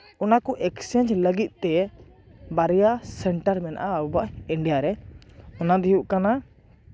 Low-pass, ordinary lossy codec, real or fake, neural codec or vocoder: none; none; real; none